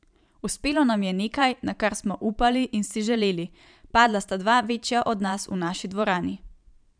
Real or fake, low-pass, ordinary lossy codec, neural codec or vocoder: fake; 9.9 kHz; none; vocoder, 44.1 kHz, 128 mel bands every 512 samples, BigVGAN v2